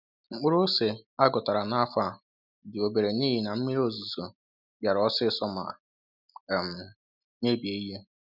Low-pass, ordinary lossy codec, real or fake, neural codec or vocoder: 5.4 kHz; none; real; none